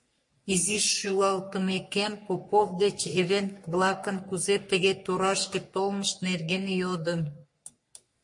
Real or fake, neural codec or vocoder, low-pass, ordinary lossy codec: fake; codec, 44.1 kHz, 3.4 kbps, Pupu-Codec; 10.8 kHz; MP3, 48 kbps